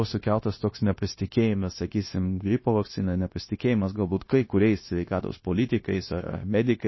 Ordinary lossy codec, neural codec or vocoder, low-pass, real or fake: MP3, 24 kbps; codec, 16 kHz, 0.9 kbps, LongCat-Audio-Codec; 7.2 kHz; fake